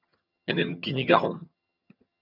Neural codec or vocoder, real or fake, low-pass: vocoder, 22.05 kHz, 80 mel bands, HiFi-GAN; fake; 5.4 kHz